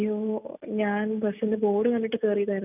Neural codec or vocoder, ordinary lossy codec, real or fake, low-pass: none; none; real; 3.6 kHz